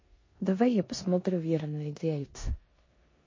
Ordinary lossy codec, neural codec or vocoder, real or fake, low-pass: MP3, 32 kbps; codec, 16 kHz in and 24 kHz out, 0.9 kbps, LongCat-Audio-Codec, four codebook decoder; fake; 7.2 kHz